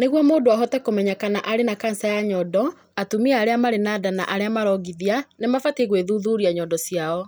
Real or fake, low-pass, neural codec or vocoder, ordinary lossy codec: real; none; none; none